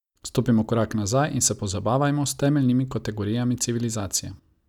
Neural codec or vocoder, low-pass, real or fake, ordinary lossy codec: none; 19.8 kHz; real; none